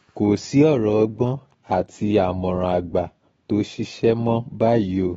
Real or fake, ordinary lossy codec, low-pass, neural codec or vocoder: fake; AAC, 24 kbps; 19.8 kHz; autoencoder, 48 kHz, 128 numbers a frame, DAC-VAE, trained on Japanese speech